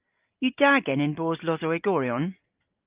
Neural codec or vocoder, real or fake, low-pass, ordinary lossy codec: none; real; 3.6 kHz; Opus, 24 kbps